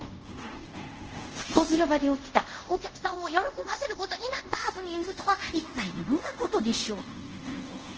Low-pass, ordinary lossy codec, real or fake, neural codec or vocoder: 7.2 kHz; Opus, 16 kbps; fake; codec, 24 kHz, 0.5 kbps, DualCodec